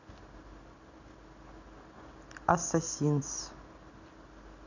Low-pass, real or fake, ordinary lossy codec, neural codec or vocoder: 7.2 kHz; real; none; none